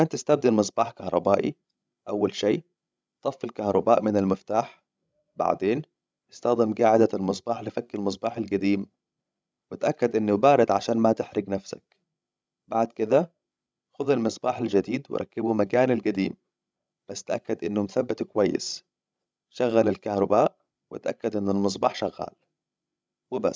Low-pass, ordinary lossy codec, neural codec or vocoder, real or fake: none; none; codec, 16 kHz, 16 kbps, FreqCodec, larger model; fake